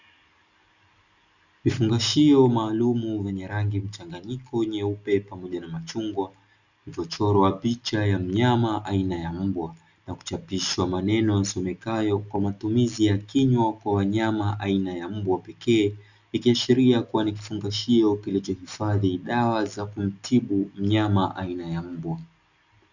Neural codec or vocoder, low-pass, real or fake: none; 7.2 kHz; real